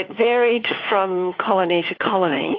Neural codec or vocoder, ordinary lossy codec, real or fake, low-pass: codec, 16 kHz, 4 kbps, FunCodec, trained on LibriTTS, 50 frames a second; AAC, 32 kbps; fake; 7.2 kHz